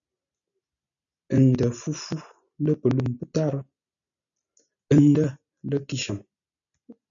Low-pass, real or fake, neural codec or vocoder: 7.2 kHz; real; none